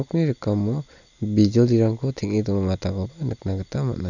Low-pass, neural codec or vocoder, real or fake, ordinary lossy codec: 7.2 kHz; none; real; none